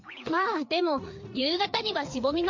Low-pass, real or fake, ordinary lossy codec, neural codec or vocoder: 7.2 kHz; fake; MP3, 48 kbps; codec, 16 kHz, 4 kbps, FreqCodec, larger model